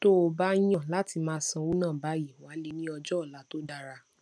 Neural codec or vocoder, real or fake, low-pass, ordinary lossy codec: none; real; none; none